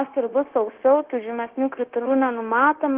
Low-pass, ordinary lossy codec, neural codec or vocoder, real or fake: 3.6 kHz; Opus, 16 kbps; codec, 16 kHz, 0.9 kbps, LongCat-Audio-Codec; fake